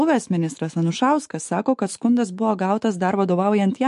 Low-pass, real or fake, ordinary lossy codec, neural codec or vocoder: 14.4 kHz; fake; MP3, 48 kbps; autoencoder, 48 kHz, 128 numbers a frame, DAC-VAE, trained on Japanese speech